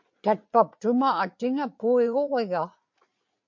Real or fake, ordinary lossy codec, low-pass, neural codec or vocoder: fake; MP3, 48 kbps; 7.2 kHz; vocoder, 44.1 kHz, 80 mel bands, Vocos